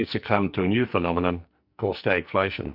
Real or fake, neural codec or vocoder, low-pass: fake; codec, 44.1 kHz, 2.6 kbps, SNAC; 5.4 kHz